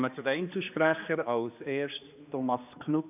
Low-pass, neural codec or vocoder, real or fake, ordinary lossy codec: 3.6 kHz; codec, 16 kHz, 2 kbps, X-Codec, HuBERT features, trained on general audio; fake; AAC, 32 kbps